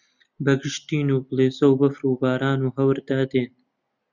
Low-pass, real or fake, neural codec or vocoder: 7.2 kHz; real; none